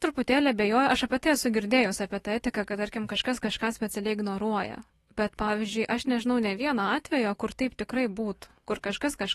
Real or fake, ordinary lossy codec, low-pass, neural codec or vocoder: fake; AAC, 32 kbps; 19.8 kHz; autoencoder, 48 kHz, 128 numbers a frame, DAC-VAE, trained on Japanese speech